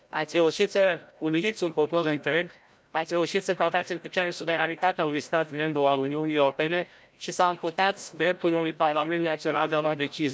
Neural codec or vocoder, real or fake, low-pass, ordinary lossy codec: codec, 16 kHz, 0.5 kbps, FreqCodec, larger model; fake; none; none